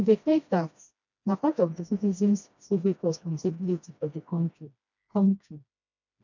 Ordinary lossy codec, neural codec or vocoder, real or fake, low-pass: none; codec, 16 kHz, 1 kbps, FreqCodec, smaller model; fake; 7.2 kHz